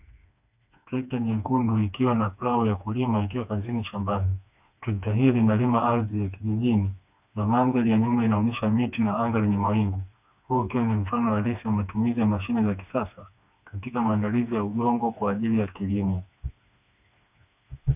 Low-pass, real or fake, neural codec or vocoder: 3.6 kHz; fake; codec, 16 kHz, 2 kbps, FreqCodec, smaller model